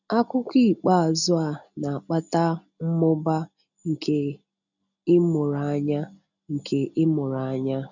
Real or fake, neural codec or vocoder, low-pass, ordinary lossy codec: real; none; 7.2 kHz; none